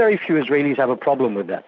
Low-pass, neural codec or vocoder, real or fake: 7.2 kHz; none; real